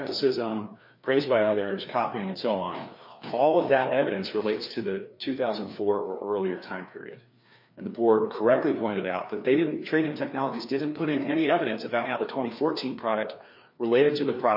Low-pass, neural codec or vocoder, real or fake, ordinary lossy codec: 5.4 kHz; codec, 16 kHz, 2 kbps, FreqCodec, larger model; fake; MP3, 32 kbps